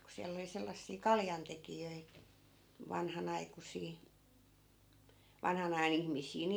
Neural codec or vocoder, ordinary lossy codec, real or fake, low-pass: none; none; real; none